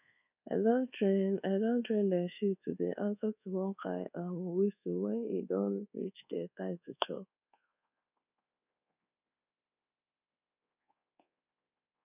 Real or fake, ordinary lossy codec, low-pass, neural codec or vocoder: fake; none; 3.6 kHz; codec, 24 kHz, 1.2 kbps, DualCodec